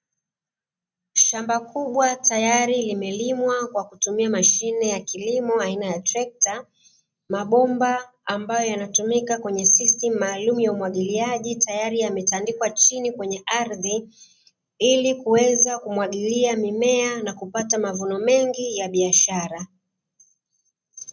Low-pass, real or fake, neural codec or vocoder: 7.2 kHz; real; none